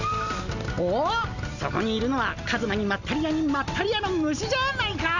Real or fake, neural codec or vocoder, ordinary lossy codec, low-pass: real; none; none; 7.2 kHz